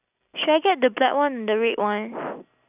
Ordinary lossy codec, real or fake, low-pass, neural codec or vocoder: none; real; 3.6 kHz; none